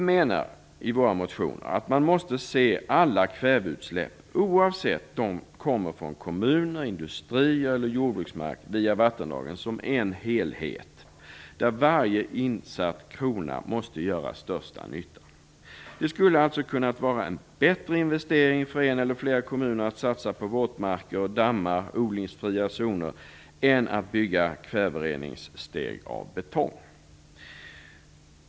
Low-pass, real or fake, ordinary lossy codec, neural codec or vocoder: none; real; none; none